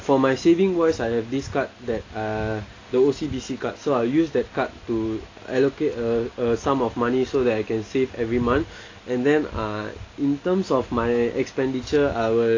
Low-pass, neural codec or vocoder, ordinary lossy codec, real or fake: 7.2 kHz; none; AAC, 32 kbps; real